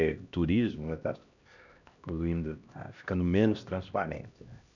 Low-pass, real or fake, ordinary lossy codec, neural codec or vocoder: 7.2 kHz; fake; none; codec, 16 kHz, 1 kbps, X-Codec, HuBERT features, trained on LibriSpeech